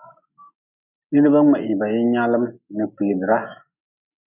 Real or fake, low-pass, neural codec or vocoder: real; 3.6 kHz; none